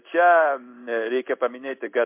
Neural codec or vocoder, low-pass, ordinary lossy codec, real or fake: codec, 16 kHz in and 24 kHz out, 1 kbps, XY-Tokenizer; 3.6 kHz; MP3, 32 kbps; fake